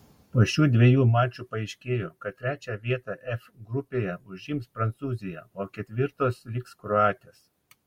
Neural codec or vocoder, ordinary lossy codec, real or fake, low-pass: none; MP3, 64 kbps; real; 19.8 kHz